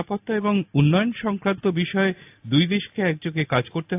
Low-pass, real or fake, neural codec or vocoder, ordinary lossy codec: 3.6 kHz; real; none; AAC, 32 kbps